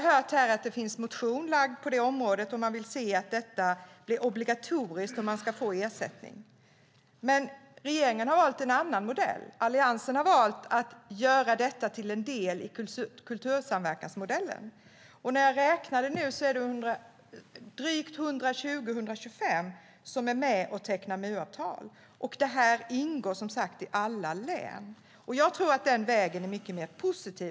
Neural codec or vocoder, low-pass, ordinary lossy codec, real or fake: none; none; none; real